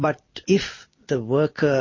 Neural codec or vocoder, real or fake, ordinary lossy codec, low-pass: vocoder, 44.1 kHz, 128 mel bands every 256 samples, BigVGAN v2; fake; MP3, 32 kbps; 7.2 kHz